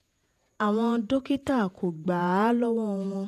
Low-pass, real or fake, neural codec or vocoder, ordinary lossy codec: 14.4 kHz; fake; vocoder, 48 kHz, 128 mel bands, Vocos; none